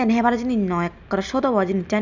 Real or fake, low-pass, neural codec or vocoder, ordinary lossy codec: real; 7.2 kHz; none; none